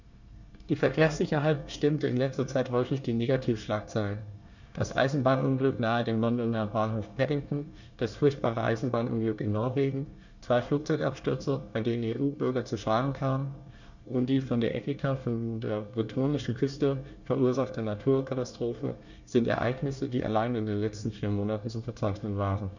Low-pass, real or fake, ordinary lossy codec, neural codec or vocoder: 7.2 kHz; fake; none; codec, 24 kHz, 1 kbps, SNAC